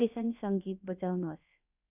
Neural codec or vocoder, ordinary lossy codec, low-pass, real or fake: codec, 16 kHz, about 1 kbps, DyCAST, with the encoder's durations; none; 3.6 kHz; fake